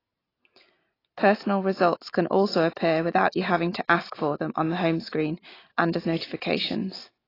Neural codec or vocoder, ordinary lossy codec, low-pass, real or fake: none; AAC, 24 kbps; 5.4 kHz; real